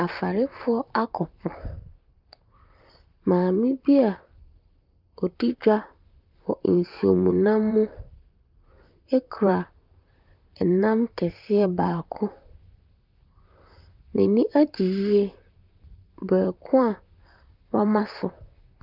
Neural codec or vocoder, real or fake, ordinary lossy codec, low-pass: vocoder, 44.1 kHz, 128 mel bands, Pupu-Vocoder; fake; Opus, 24 kbps; 5.4 kHz